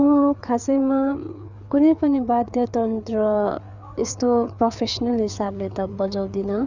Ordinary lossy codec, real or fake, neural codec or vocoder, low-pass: none; fake; codec, 16 kHz, 4 kbps, FreqCodec, larger model; 7.2 kHz